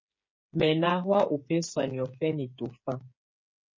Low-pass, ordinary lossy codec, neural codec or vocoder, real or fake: 7.2 kHz; MP3, 32 kbps; codec, 16 kHz, 8 kbps, FreqCodec, smaller model; fake